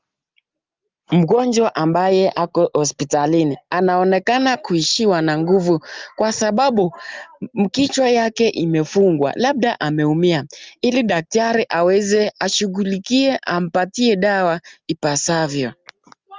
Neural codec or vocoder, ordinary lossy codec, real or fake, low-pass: none; Opus, 32 kbps; real; 7.2 kHz